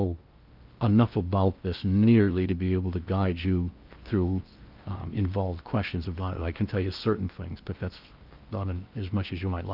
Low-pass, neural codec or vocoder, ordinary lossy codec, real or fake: 5.4 kHz; codec, 16 kHz in and 24 kHz out, 0.8 kbps, FocalCodec, streaming, 65536 codes; Opus, 24 kbps; fake